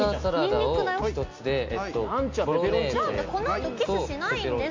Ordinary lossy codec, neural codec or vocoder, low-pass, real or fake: MP3, 48 kbps; none; 7.2 kHz; real